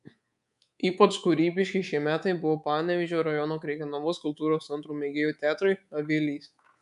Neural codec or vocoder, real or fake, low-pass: codec, 24 kHz, 3.1 kbps, DualCodec; fake; 10.8 kHz